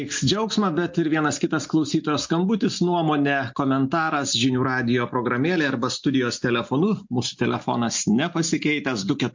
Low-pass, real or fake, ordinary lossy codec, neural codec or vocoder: 7.2 kHz; real; MP3, 48 kbps; none